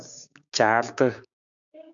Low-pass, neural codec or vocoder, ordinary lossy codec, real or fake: 7.2 kHz; codec, 16 kHz, 6 kbps, DAC; MP3, 64 kbps; fake